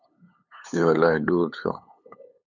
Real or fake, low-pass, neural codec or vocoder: fake; 7.2 kHz; codec, 16 kHz, 8 kbps, FunCodec, trained on LibriTTS, 25 frames a second